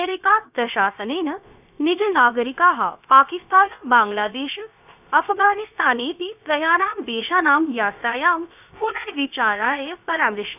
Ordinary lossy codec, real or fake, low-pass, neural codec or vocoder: none; fake; 3.6 kHz; codec, 16 kHz, about 1 kbps, DyCAST, with the encoder's durations